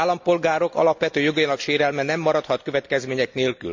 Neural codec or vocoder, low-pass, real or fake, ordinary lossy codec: none; 7.2 kHz; real; MP3, 64 kbps